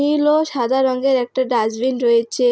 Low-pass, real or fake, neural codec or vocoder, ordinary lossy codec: none; real; none; none